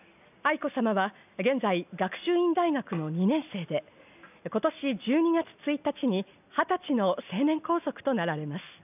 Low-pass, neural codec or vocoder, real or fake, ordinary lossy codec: 3.6 kHz; none; real; none